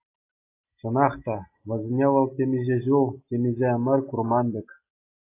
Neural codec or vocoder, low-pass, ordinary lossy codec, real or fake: none; 3.6 kHz; AAC, 24 kbps; real